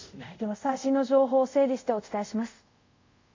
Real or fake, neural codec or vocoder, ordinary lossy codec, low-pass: fake; codec, 24 kHz, 0.5 kbps, DualCodec; MP3, 48 kbps; 7.2 kHz